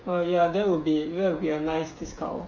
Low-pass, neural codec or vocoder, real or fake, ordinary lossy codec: 7.2 kHz; codec, 16 kHz, 8 kbps, FreqCodec, smaller model; fake; MP3, 48 kbps